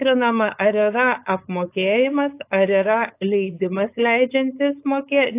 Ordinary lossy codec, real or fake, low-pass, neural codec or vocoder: AAC, 24 kbps; fake; 3.6 kHz; codec, 16 kHz, 4.8 kbps, FACodec